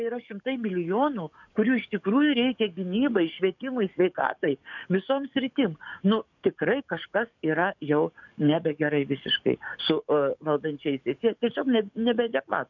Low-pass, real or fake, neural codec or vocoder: 7.2 kHz; fake; codec, 44.1 kHz, 7.8 kbps, DAC